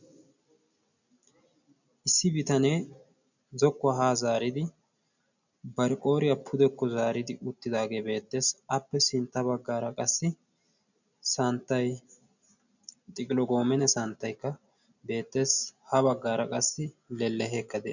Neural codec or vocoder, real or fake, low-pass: none; real; 7.2 kHz